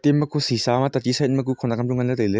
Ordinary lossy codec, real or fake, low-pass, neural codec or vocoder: none; real; none; none